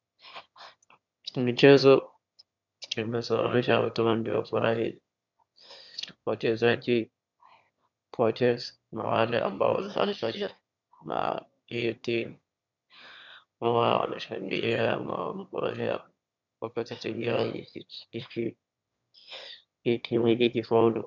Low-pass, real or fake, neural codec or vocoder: 7.2 kHz; fake; autoencoder, 22.05 kHz, a latent of 192 numbers a frame, VITS, trained on one speaker